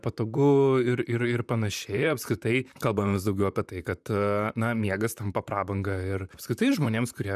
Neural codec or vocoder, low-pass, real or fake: vocoder, 44.1 kHz, 128 mel bands, Pupu-Vocoder; 14.4 kHz; fake